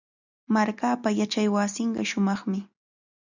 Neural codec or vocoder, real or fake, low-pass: none; real; 7.2 kHz